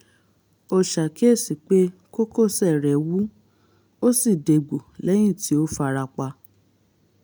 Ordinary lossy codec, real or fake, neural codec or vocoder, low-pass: none; real; none; none